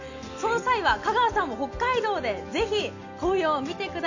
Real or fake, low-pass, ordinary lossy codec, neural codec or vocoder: real; 7.2 kHz; none; none